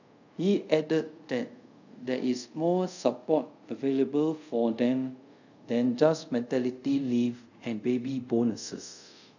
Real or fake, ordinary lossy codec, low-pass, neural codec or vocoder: fake; none; 7.2 kHz; codec, 24 kHz, 0.5 kbps, DualCodec